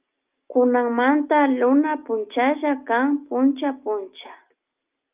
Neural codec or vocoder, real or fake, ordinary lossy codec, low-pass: none; real; Opus, 24 kbps; 3.6 kHz